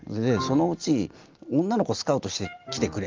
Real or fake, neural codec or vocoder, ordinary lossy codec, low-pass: real; none; Opus, 24 kbps; 7.2 kHz